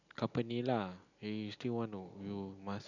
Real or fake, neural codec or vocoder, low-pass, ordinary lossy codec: real; none; 7.2 kHz; none